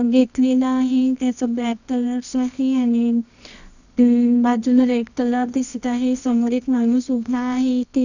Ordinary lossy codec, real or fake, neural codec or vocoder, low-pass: none; fake; codec, 24 kHz, 0.9 kbps, WavTokenizer, medium music audio release; 7.2 kHz